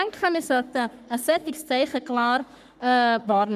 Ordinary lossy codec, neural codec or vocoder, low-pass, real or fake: none; codec, 44.1 kHz, 3.4 kbps, Pupu-Codec; 14.4 kHz; fake